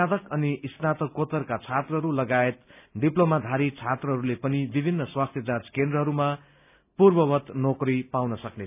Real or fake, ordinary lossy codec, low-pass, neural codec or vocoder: real; none; 3.6 kHz; none